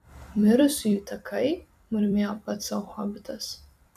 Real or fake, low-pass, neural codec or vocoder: fake; 14.4 kHz; vocoder, 44.1 kHz, 128 mel bands every 256 samples, BigVGAN v2